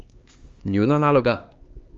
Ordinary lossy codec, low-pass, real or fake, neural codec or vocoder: Opus, 32 kbps; 7.2 kHz; fake; codec, 16 kHz, 4 kbps, X-Codec, HuBERT features, trained on LibriSpeech